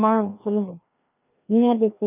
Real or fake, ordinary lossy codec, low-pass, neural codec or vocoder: fake; none; 3.6 kHz; codec, 16 kHz, 1 kbps, FreqCodec, larger model